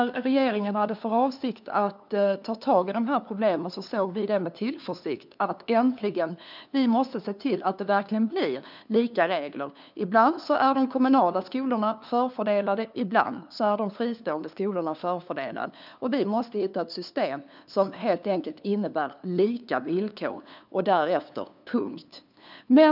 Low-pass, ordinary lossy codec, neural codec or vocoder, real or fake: 5.4 kHz; none; codec, 16 kHz, 2 kbps, FunCodec, trained on LibriTTS, 25 frames a second; fake